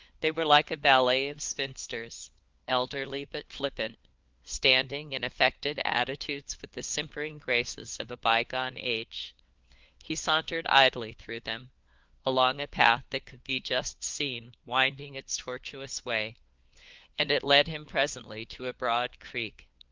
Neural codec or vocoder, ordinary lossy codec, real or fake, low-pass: codec, 16 kHz, 4 kbps, FunCodec, trained on LibriTTS, 50 frames a second; Opus, 32 kbps; fake; 7.2 kHz